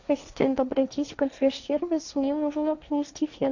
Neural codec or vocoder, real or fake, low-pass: codec, 16 kHz, 1.1 kbps, Voila-Tokenizer; fake; 7.2 kHz